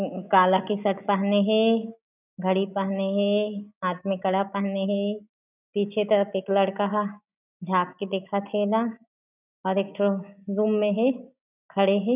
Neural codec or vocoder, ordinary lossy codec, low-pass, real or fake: none; none; 3.6 kHz; real